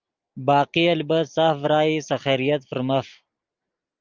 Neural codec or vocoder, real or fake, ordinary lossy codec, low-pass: none; real; Opus, 24 kbps; 7.2 kHz